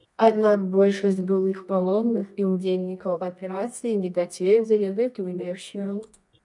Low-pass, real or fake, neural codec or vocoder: 10.8 kHz; fake; codec, 24 kHz, 0.9 kbps, WavTokenizer, medium music audio release